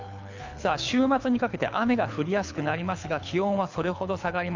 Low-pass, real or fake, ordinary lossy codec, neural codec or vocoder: 7.2 kHz; fake; MP3, 64 kbps; codec, 24 kHz, 6 kbps, HILCodec